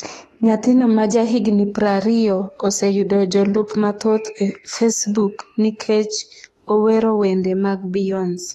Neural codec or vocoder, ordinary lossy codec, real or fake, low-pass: autoencoder, 48 kHz, 32 numbers a frame, DAC-VAE, trained on Japanese speech; AAC, 32 kbps; fake; 19.8 kHz